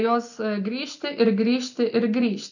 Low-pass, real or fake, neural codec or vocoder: 7.2 kHz; real; none